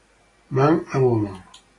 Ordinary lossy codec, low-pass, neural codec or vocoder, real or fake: AAC, 32 kbps; 10.8 kHz; none; real